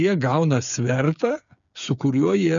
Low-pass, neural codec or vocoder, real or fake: 7.2 kHz; codec, 16 kHz, 8 kbps, FreqCodec, smaller model; fake